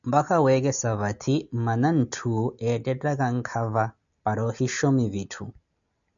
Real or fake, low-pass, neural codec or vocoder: real; 7.2 kHz; none